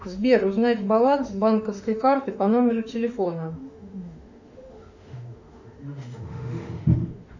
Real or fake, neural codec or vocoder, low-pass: fake; autoencoder, 48 kHz, 32 numbers a frame, DAC-VAE, trained on Japanese speech; 7.2 kHz